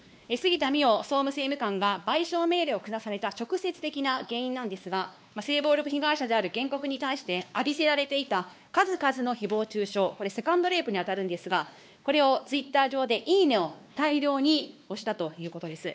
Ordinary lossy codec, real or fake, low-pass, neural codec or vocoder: none; fake; none; codec, 16 kHz, 2 kbps, X-Codec, WavLM features, trained on Multilingual LibriSpeech